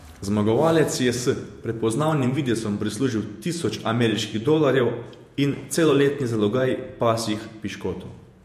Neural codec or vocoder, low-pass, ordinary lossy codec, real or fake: vocoder, 48 kHz, 128 mel bands, Vocos; 14.4 kHz; MP3, 64 kbps; fake